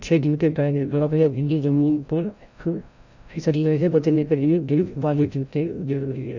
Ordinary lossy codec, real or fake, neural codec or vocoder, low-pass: none; fake; codec, 16 kHz, 0.5 kbps, FreqCodec, larger model; 7.2 kHz